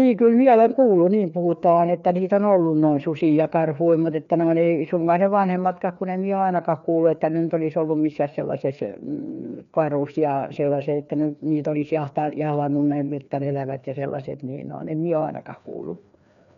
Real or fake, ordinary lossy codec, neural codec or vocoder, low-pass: fake; none; codec, 16 kHz, 2 kbps, FreqCodec, larger model; 7.2 kHz